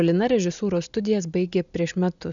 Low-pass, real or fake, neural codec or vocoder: 7.2 kHz; real; none